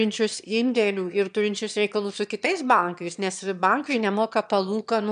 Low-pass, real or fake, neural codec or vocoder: 9.9 kHz; fake; autoencoder, 22.05 kHz, a latent of 192 numbers a frame, VITS, trained on one speaker